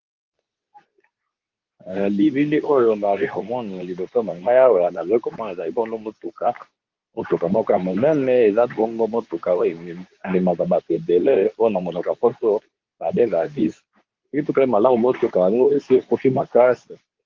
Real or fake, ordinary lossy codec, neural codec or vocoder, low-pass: fake; Opus, 32 kbps; codec, 24 kHz, 0.9 kbps, WavTokenizer, medium speech release version 2; 7.2 kHz